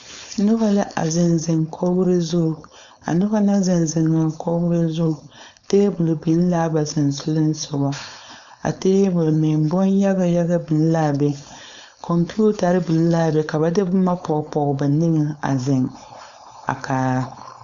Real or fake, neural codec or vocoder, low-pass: fake; codec, 16 kHz, 4.8 kbps, FACodec; 7.2 kHz